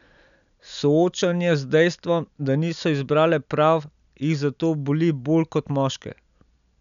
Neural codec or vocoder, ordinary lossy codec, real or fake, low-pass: none; none; real; 7.2 kHz